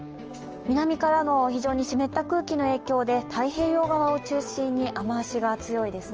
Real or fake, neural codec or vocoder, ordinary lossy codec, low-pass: fake; codec, 16 kHz, 6 kbps, DAC; Opus, 24 kbps; 7.2 kHz